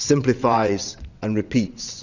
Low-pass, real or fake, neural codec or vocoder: 7.2 kHz; fake; vocoder, 22.05 kHz, 80 mel bands, WaveNeXt